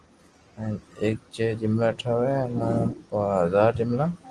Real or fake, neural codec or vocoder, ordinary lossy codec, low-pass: real; none; Opus, 24 kbps; 10.8 kHz